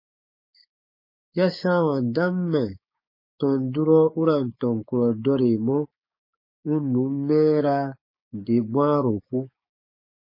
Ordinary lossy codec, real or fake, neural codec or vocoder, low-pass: MP3, 24 kbps; fake; codec, 16 kHz, 6 kbps, DAC; 5.4 kHz